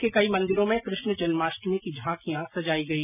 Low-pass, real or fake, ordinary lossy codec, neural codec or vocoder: 3.6 kHz; real; none; none